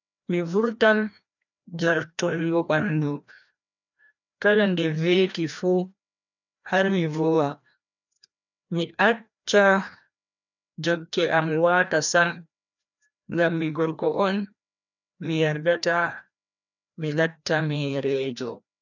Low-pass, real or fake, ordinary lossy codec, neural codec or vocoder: 7.2 kHz; fake; none; codec, 16 kHz, 1 kbps, FreqCodec, larger model